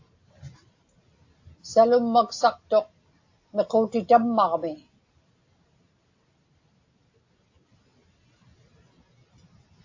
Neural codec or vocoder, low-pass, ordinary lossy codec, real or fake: none; 7.2 kHz; AAC, 48 kbps; real